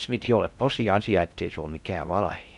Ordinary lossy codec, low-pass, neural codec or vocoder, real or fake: none; 10.8 kHz; codec, 16 kHz in and 24 kHz out, 0.6 kbps, FocalCodec, streaming, 4096 codes; fake